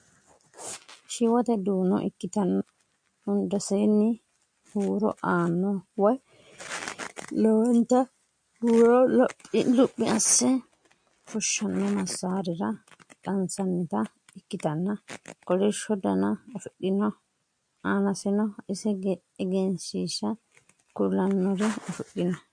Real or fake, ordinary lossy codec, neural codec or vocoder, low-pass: real; MP3, 48 kbps; none; 9.9 kHz